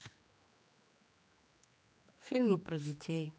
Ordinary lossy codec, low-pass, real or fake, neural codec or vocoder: none; none; fake; codec, 16 kHz, 2 kbps, X-Codec, HuBERT features, trained on general audio